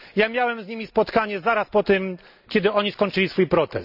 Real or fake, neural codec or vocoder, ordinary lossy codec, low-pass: real; none; none; 5.4 kHz